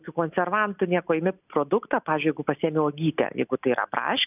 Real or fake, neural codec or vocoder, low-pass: real; none; 3.6 kHz